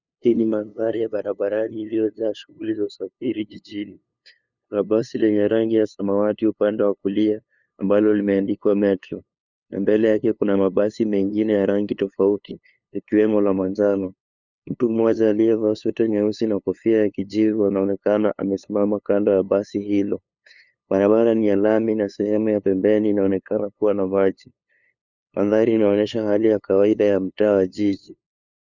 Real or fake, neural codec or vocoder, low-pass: fake; codec, 16 kHz, 2 kbps, FunCodec, trained on LibriTTS, 25 frames a second; 7.2 kHz